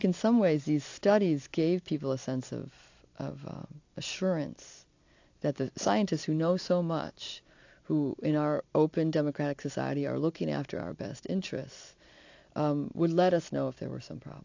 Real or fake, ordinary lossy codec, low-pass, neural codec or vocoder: fake; AAC, 48 kbps; 7.2 kHz; vocoder, 44.1 kHz, 128 mel bands every 256 samples, BigVGAN v2